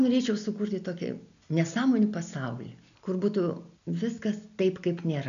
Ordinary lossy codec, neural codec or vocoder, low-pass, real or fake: MP3, 96 kbps; none; 7.2 kHz; real